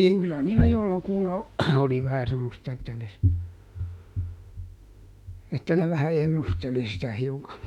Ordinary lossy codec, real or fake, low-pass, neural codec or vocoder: MP3, 96 kbps; fake; 19.8 kHz; autoencoder, 48 kHz, 32 numbers a frame, DAC-VAE, trained on Japanese speech